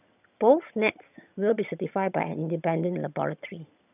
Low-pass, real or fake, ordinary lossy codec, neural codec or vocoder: 3.6 kHz; fake; none; vocoder, 22.05 kHz, 80 mel bands, HiFi-GAN